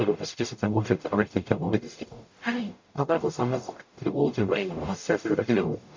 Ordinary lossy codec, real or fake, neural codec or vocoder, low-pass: MP3, 64 kbps; fake; codec, 44.1 kHz, 0.9 kbps, DAC; 7.2 kHz